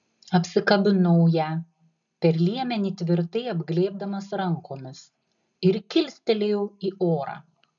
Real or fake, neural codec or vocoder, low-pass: real; none; 7.2 kHz